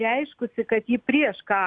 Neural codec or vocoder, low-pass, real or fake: none; 9.9 kHz; real